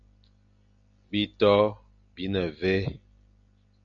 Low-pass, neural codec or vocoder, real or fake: 7.2 kHz; none; real